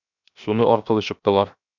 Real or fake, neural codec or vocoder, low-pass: fake; codec, 16 kHz, 0.7 kbps, FocalCodec; 7.2 kHz